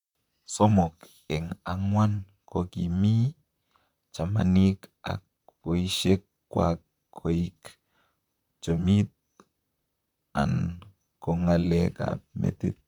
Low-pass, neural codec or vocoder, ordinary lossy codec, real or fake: 19.8 kHz; vocoder, 44.1 kHz, 128 mel bands, Pupu-Vocoder; none; fake